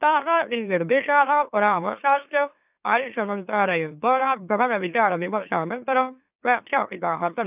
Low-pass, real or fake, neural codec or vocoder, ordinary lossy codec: 3.6 kHz; fake; autoencoder, 44.1 kHz, a latent of 192 numbers a frame, MeloTTS; none